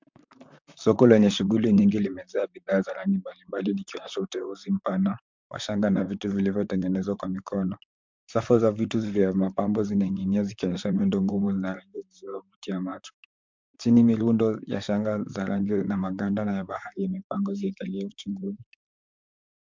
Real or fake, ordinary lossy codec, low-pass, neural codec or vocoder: fake; MP3, 64 kbps; 7.2 kHz; vocoder, 44.1 kHz, 128 mel bands, Pupu-Vocoder